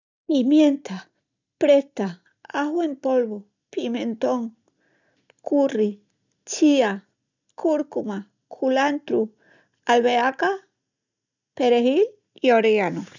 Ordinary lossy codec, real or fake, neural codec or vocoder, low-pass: none; real; none; 7.2 kHz